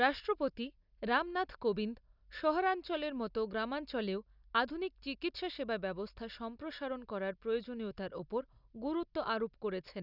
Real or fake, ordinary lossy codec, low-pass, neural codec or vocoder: real; none; 5.4 kHz; none